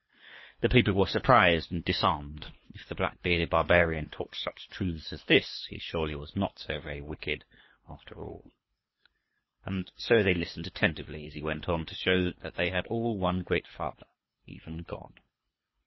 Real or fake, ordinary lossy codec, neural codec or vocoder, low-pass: fake; MP3, 24 kbps; codec, 24 kHz, 3 kbps, HILCodec; 7.2 kHz